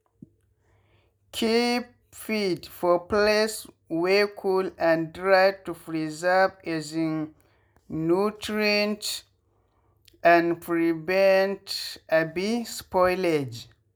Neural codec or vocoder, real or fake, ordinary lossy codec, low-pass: none; real; none; none